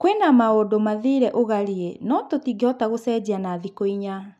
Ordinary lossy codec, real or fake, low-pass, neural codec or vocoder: none; real; none; none